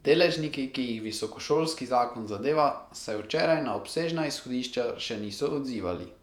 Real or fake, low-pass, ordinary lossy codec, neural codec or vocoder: fake; 19.8 kHz; none; vocoder, 48 kHz, 128 mel bands, Vocos